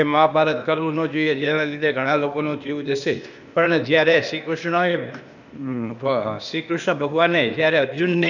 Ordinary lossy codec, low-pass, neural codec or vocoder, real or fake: none; 7.2 kHz; codec, 16 kHz, 0.8 kbps, ZipCodec; fake